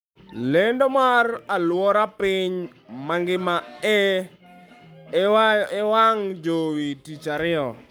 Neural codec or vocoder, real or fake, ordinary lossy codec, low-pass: codec, 44.1 kHz, 7.8 kbps, Pupu-Codec; fake; none; none